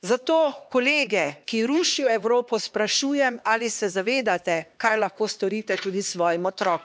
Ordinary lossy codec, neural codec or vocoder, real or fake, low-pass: none; codec, 16 kHz, 2 kbps, X-Codec, HuBERT features, trained on LibriSpeech; fake; none